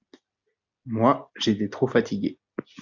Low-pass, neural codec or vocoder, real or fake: 7.2 kHz; none; real